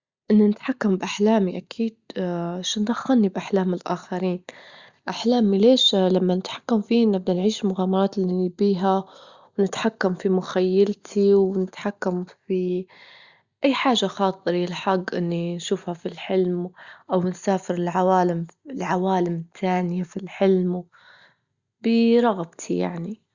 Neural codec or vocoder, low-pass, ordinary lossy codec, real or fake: none; 7.2 kHz; Opus, 64 kbps; real